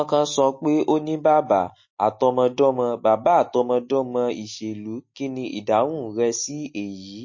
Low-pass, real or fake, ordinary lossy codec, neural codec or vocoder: 7.2 kHz; real; MP3, 32 kbps; none